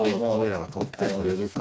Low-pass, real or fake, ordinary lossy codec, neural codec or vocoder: none; fake; none; codec, 16 kHz, 2 kbps, FreqCodec, smaller model